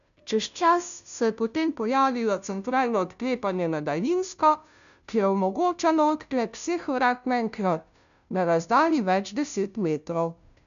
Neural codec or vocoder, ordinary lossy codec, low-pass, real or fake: codec, 16 kHz, 0.5 kbps, FunCodec, trained on Chinese and English, 25 frames a second; none; 7.2 kHz; fake